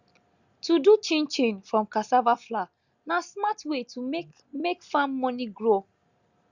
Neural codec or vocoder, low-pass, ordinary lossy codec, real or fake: none; 7.2 kHz; Opus, 64 kbps; real